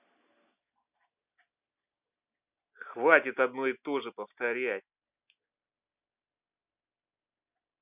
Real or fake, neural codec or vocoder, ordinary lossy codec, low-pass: real; none; none; 3.6 kHz